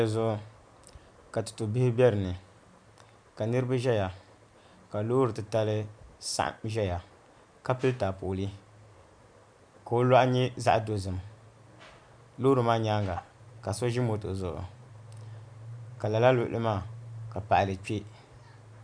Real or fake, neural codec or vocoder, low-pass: real; none; 9.9 kHz